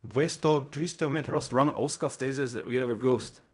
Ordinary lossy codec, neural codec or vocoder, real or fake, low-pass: none; codec, 16 kHz in and 24 kHz out, 0.4 kbps, LongCat-Audio-Codec, fine tuned four codebook decoder; fake; 10.8 kHz